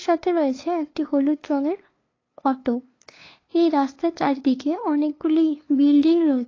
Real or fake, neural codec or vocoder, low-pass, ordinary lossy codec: fake; codec, 16 kHz, 2 kbps, FunCodec, trained on LibriTTS, 25 frames a second; 7.2 kHz; AAC, 32 kbps